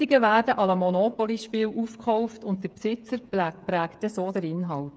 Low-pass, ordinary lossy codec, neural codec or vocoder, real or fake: none; none; codec, 16 kHz, 8 kbps, FreqCodec, smaller model; fake